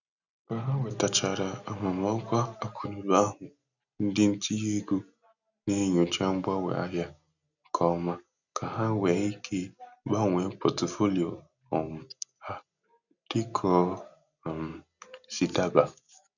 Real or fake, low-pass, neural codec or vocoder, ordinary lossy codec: real; 7.2 kHz; none; none